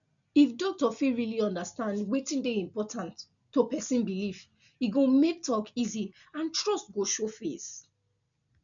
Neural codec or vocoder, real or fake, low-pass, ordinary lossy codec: none; real; 7.2 kHz; none